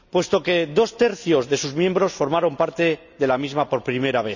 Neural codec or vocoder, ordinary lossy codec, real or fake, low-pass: none; none; real; 7.2 kHz